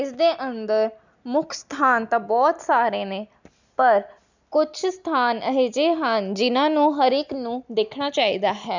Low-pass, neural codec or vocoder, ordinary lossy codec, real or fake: 7.2 kHz; none; none; real